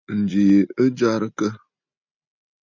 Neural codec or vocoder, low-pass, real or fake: none; 7.2 kHz; real